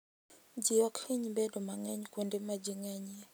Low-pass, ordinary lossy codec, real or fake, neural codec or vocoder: none; none; real; none